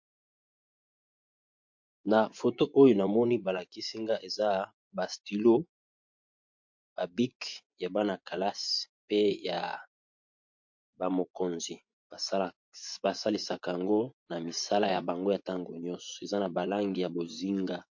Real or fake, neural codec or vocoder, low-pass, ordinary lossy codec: fake; vocoder, 24 kHz, 100 mel bands, Vocos; 7.2 kHz; MP3, 48 kbps